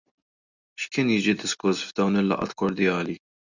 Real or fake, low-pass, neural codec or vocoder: real; 7.2 kHz; none